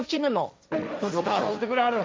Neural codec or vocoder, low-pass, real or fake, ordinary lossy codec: codec, 16 kHz, 1.1 kbps, Voila-Tokenizer; none; fake; none